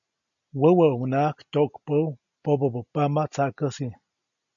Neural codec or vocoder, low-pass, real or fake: none; 7.2 kHz; real